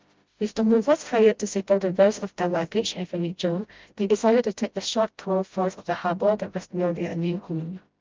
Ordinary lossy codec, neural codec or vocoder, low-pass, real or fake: Opus, 32 kbps; codec, 16 kHz, 0.5 kbps, FreqCodec, smaller model; 7.2 kHz; fake